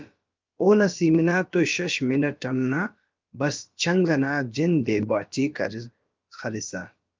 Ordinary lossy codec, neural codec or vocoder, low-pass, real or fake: Opus, 24 kbps; codec, 16 kHz, about 1 kbps, DyCAST, with the encoder's durations; 7.2 kHz; fake